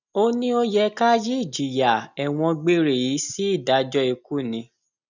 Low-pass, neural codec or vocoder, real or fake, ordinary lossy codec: 7.2 kHz; none; real; none